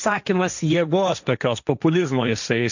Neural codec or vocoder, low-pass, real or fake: codec, 16 kHz, 1.1 kbps, Voila-Tokenizer; 7.2 kHz; fake